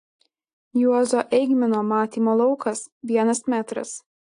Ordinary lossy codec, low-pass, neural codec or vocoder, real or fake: AAC, 48 kbps; 10.8 kHz; none; real